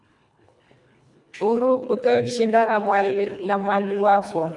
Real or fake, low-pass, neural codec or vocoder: fake; 10.8 kHz; codec, 24 kHz, 1.5 kbps, HILCodec